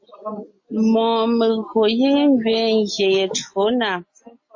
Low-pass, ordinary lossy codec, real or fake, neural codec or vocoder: 7.2 kHz; MP3, 32 kbps; real; none